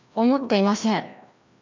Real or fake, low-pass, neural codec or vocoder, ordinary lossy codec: fake; 7.2 kHz; codec, 16 kHz, 1 kbps, FreqCodec, larger model; MP3, 64 kbps